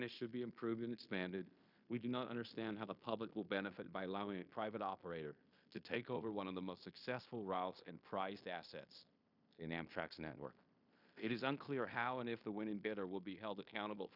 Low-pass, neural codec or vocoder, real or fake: 5.4 kHz; codec, 16 kHz in and 24 kHz out, 0.9 kbps, LongCat-Audio-Codec, fine tuned four codebook decoder; fake